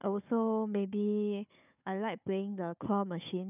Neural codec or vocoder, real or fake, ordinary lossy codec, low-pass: codec, 16 kHz, 4 kbps, FunCodec, trained on Chinese and English, 50 frames a second; fake; none; 3.6 kHz